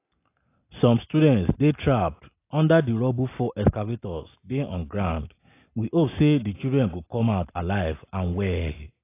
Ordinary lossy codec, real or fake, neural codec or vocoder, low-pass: AAC, 24 kbps; real; none; 3.6 kHz